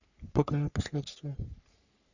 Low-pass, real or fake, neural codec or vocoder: 7.2 kHz; fake; codec, 44.1 kHz, 3.4 kbps, Pupu-Codec